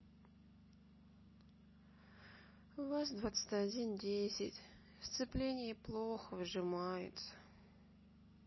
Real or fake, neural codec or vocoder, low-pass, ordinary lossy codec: real; none; 7.2 kHz; MP3, 24 kbps